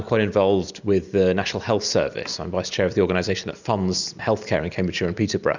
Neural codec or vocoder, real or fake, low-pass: none; real; 7.2 kHz